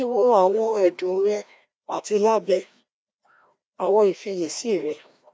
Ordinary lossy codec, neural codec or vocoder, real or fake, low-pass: none; codec, 16 kHz, 1 kbps, FreqCodec, larger model; fake; none